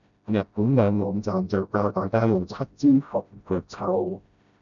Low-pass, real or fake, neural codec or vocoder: 7.2 kHz; fake; codec, 16 kHz, 0.5 kbps, FreqCodec, smaller model